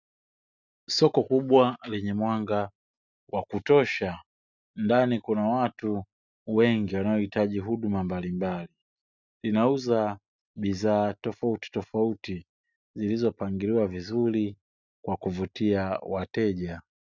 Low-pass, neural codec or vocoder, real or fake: 7.2 kHz; none; real